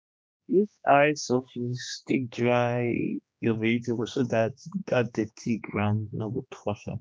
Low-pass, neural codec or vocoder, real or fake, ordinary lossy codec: none; codec, 16 kHz, 2 kbps, X-Codec, HuBERT features, trained on general audio; fake; none